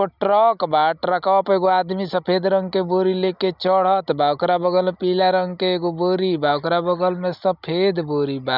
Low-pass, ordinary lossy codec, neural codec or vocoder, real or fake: 5.4 kHz; none; none; real